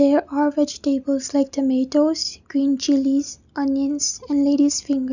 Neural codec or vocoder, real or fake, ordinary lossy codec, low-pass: none; real; none; 7.2 kHz